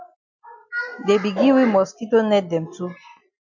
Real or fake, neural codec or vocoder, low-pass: real; none; 7.2 kHz